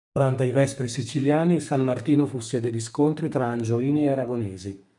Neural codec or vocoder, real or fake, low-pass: codec, 32 kHz, 1.9 kbps, SNAC; fake; 10.8 kHz